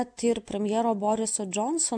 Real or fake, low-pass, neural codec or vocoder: real; 9.9 kHz; none